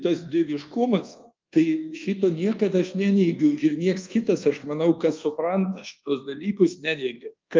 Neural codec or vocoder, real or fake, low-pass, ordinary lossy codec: codec, 24 kHz, 1.2 kbps, DualCodec; fake; 7.2 kHz; Opus, 24 kbps